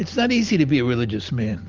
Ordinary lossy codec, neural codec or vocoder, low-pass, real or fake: Opus, 32 kbps; none; 7.2 kHz; real